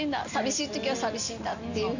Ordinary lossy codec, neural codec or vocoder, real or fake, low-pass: none; none; real; 7.2 kHz